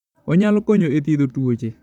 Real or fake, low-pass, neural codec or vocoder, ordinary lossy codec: fake; 19.8 kHz; vocoder, 44.1 kHz, 128 mel bands, Pupu-Vocoder; none